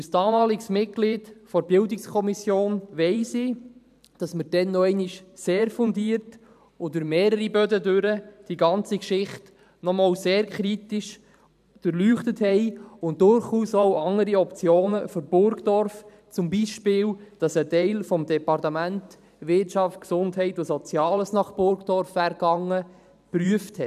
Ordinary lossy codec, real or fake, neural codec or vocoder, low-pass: none; fake; vocoder, 44.1 kHz, 128 mel bands every 512 samples, BigVGAN v2; 14.4 kHz